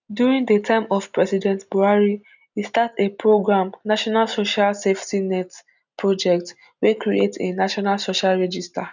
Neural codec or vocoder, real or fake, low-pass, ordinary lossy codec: none; real; 7.2 kHz; none